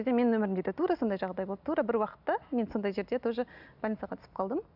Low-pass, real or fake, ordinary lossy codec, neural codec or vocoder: 5.4 kHz; real; none; none